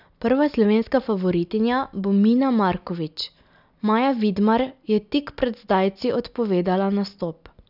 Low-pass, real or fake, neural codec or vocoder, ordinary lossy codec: 5.4 kHz; real; none; none